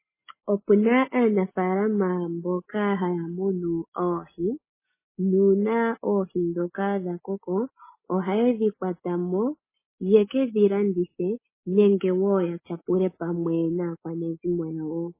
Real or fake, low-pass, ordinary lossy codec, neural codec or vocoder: real; 3.6 kHz; MP3, 16 kbps; none